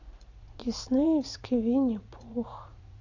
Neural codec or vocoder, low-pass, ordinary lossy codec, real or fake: vocoder, 44.1 kHz, 80 mel bands, Vocos; 7.2 kHz; none; fake